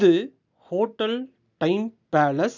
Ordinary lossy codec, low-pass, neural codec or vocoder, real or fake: none; 7.2 kHz; none; real